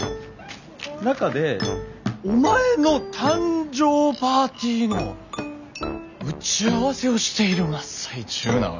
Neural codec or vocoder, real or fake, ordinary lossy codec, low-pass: none; real; none; 7.2 kHz